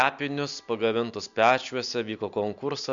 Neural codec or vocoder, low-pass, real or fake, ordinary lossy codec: none; 7.2 kHz; real; Opus, 64 kbps